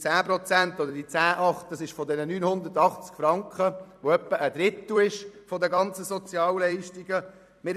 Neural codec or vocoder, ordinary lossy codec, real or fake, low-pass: vocoder, 44.1 kHz, 128 mel bands every 256 samples, BigVGAN v2; none; fake; 14.4 kHz